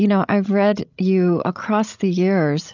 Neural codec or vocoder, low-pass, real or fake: codec, 16 kHz, 16 kbps, FreqCodec, larger model; 7.2 kHz; fake